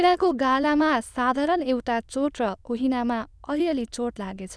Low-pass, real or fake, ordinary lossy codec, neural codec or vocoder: none; fake; none; autoencoder, 22.05 kHz, a latent of 192 numbers a frame, VITS, trained on many speakers